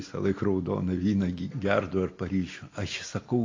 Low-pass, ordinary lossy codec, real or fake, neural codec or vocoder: 7.2 kHz; AAC, 32 kbps; real; none